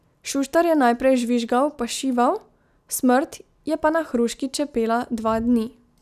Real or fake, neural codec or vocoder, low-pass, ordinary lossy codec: real; none; 14.4 kHz; none